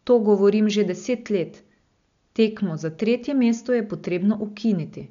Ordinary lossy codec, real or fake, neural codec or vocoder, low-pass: MP3, 64 kbps; real; none; 7.2 kHz